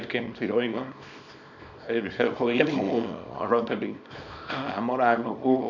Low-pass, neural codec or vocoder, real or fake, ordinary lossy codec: 7.2 kHz; codec, 24 kHz, 0.9 kbps, WavTokenizer, small release; fake; none